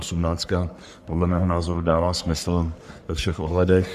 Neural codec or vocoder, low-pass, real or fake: codec, 44.1 kHz, 3.4 kbps, Pupu-Codec; 14.4 kHz; fake